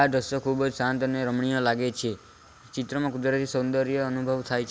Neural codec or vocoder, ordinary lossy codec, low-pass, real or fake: none; none; none; real